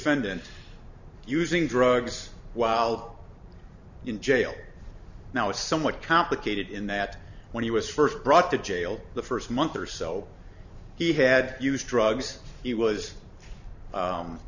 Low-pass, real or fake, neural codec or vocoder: 7.2 kHz; fake; vocoder, 44.1 kHz, 128 mel bands every 512 samples, BigVGAN v2